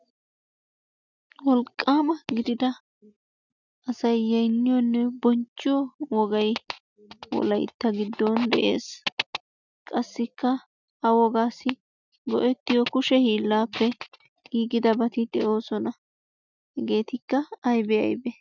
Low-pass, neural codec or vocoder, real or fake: 7.2 kHz; none; real